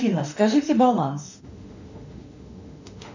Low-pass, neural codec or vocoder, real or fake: 7.2 kHz; autoencoder, 48 kHz, 32 numbers a frame, DAC-VAE, trained on Japanese speech; fake